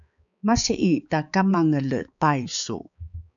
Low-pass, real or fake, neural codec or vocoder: 7.2 kHz; fake; codec, 16 kHz, 4 kbps, X-Codec, HuBERT features, trained on balanced general audio